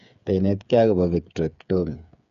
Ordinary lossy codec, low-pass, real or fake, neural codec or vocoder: none; 7.2 kHz; fake; codec, 16 kHz, 8 kbps, FreqCodec, smaller model